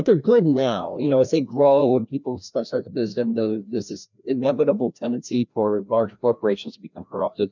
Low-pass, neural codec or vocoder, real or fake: 7.2 kHz; codec, 16 kHz, 1 kbps, FreqCodec, larger model; fake